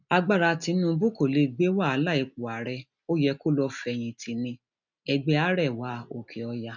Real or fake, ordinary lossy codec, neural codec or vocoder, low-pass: real; none; none; 7.2 kHz